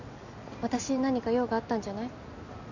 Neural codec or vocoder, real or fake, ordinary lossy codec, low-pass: none; real; none; 7.2 kHz